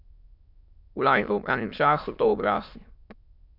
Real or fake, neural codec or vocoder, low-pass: fake; autoencoder, 22.05 kHz, a latent of 192 numbers a frame, VITS, trained on many speakers; 5.4 kHz